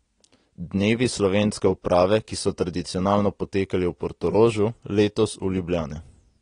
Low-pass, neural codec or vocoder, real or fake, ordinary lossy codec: 9.9 kHz; none; real; AAC, 32 kbps